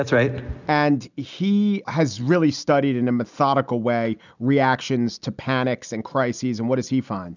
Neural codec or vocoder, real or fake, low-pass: none; real; 7.2 kHz